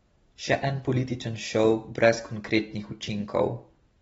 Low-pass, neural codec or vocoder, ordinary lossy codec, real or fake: 9.9 kHz; none; AAC, 24 kbps; real